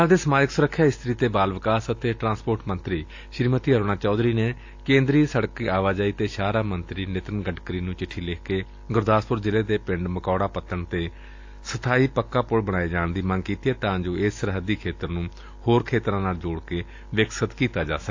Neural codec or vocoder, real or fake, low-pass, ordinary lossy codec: none; real; 7.2 kHz; AAC, 48 kbps